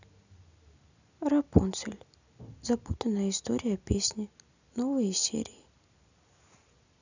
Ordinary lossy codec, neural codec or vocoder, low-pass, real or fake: none; none; 7.2 kHz; real